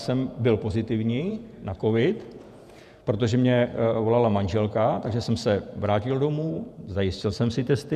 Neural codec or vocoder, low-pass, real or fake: vocoder, 48 kHz, 128 mel bands, Vocos; 14.4 kHz; fake